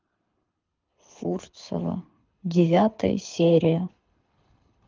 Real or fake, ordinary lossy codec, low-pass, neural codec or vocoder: fake; Opus, 32 kbps; 7.2 kHz; codec, 24 kHz, 6 kbps, HILCodec